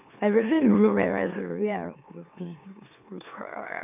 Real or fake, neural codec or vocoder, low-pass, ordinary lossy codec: fake; autoencoder, 44.1 kHz, a latent of 192 numbers a frame, MeloTTS; 3.6 kHz; none